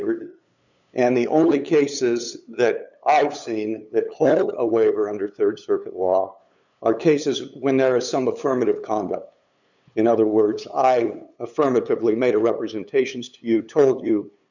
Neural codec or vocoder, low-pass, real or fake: codec, 16 kHz, 8 kbps, FunCodec, trained on LibriTTS, 25 frames a second; 7.2 kHz; fake